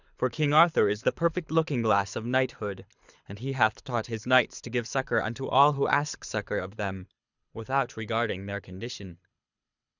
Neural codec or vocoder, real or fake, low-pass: codec, 24 kHz, 6 kbps, HILCodec; fake; 7.2 kHz